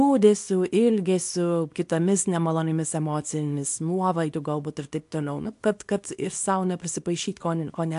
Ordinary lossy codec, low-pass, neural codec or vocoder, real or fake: AAC, 64 kbps; 10.8 kHz; codec, 24 kHz, 0.9 kbps, WavTokenizer, small release; fake